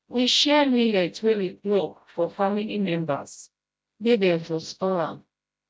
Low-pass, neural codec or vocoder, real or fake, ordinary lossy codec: none; codec, 16 kHz, 0.5 kbps, FreqCodec, smaller model; fake; none